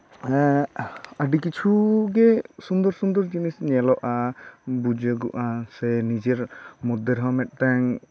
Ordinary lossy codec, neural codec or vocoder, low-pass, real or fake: none; none; none; real